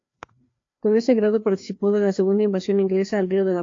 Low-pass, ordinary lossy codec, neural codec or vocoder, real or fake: 7.2 kHz; AAC, 48 kbps; codec, 16 kHz, 2 kbps, FreqCodec, larger model; fake